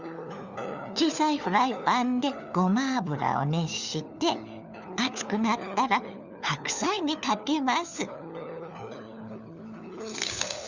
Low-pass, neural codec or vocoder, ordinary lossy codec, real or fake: 7.2 kHz; codec, 16 kHz, 4 kbps, FunCodec, trained on LibriTTS, 50 frames a second; Opus, 64 kbps; fake